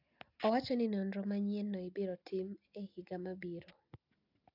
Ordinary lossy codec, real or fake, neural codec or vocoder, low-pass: AAC, 48 kbps; real; none; 5.4 kHz